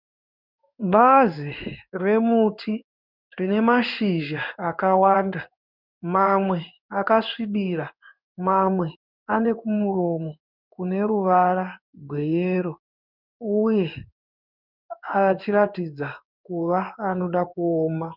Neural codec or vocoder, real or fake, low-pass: codec, 16 kHz in and 24 kHz out, 1 kbps, XY-Tokenizer; fake; 5.4 kHz